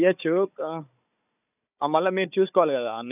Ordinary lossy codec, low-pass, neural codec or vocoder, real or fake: none; 3.6 kHz; codec, 16 kHz, 4 kbps, FunCodec, trained on Chinese and English, 50 frames a second; fake